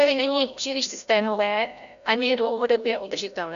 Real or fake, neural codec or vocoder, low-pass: fake; codec, 16 kHz, 0.5 kbps, FreqCodec, larger model; 7.2 kHz